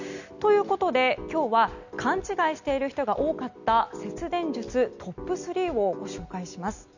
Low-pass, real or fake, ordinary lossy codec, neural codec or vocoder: 7.2 kHz; real; none; none